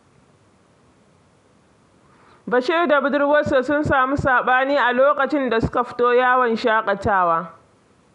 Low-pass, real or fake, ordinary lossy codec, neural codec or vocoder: 10.8 kHz; real; none; none